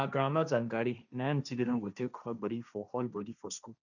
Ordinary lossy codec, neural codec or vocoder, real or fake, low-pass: none; codec, 16 kHz, 1.1 kbps, Voila-Tokenizer; fake; 7.2 kHz